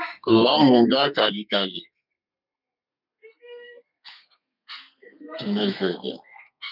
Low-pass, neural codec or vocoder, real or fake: 5.4 kHz; codec, 32 kHz, 1.9 kbps, SNAC; fake